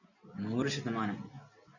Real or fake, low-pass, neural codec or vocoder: real; 7.2 kHz; none